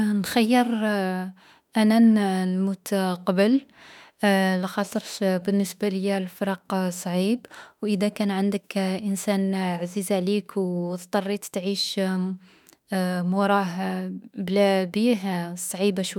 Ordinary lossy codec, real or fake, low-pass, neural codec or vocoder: none; fake; 19.8 kHz; autoencoder, 48 kHz, 32 numbers a frame, DAC-VAE, trained on Japanese speech